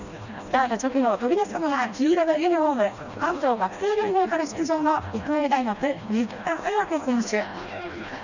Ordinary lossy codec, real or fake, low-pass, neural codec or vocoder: none; fake; 7.2 kHz; codec, 16 kHz, 1 kbps, FreqCodec, smaller model